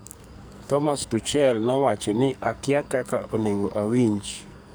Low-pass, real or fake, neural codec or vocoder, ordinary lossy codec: none; fake; codec, 44.1 kHz, 2.6 kbps, SNAC; none